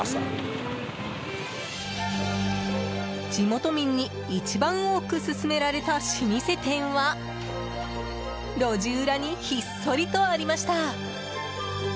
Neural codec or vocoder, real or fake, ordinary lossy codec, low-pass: none; real; none; none